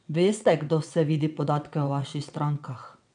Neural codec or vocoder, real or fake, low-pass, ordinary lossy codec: vocoder, 22.05 kHz, 80 mel bands, WaveNeXt; fake; 9.9 kHz; none